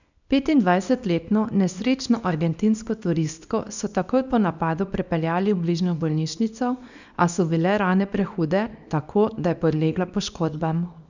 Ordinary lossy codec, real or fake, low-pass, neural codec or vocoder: none; fake; 7.2 kHz; codec, 24 kHz, 0.9 kbps, WavTokenizer, small release